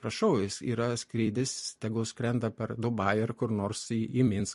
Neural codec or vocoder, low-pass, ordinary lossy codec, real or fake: vocoder, 44.1 kHz, 128 mel bands every 256 samples, BigVGAN v2; 14.4 kHz; MP3, 48 kbps; fake